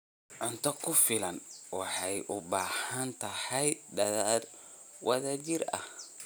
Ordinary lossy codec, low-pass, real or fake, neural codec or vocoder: none; none; real; none